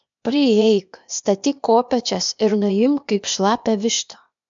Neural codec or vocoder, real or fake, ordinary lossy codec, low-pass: codec, 16 kHz, 0.8 kbps, ZipCodec; fake; MP3, 64 kbps; 7.2 kHz